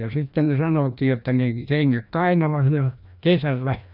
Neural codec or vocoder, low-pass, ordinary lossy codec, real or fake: codec, 16 kHz, 1 kbps, FreqCodec, larger model; 5.4 kHz; none; fake